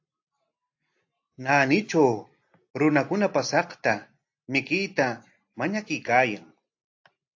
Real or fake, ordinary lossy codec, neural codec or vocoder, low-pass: real; AAC, 48 kbps; none; 7.2 kHz